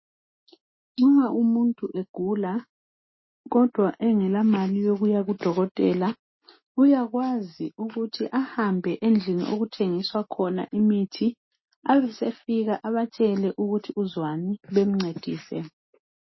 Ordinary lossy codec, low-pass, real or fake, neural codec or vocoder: MP3, 24 kbps; 7.2 kHz; real; none